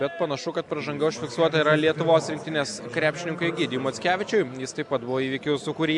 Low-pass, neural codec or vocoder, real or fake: 10.8 kHz; none; real